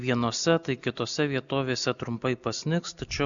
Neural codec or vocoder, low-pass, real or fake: none; 7.2 kHz; real